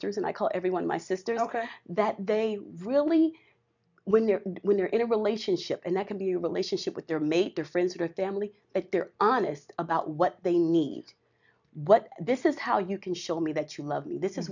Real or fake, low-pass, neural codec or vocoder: real; 7.2 kHz; none